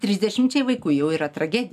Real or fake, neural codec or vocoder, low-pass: real; none; 14.4 kHz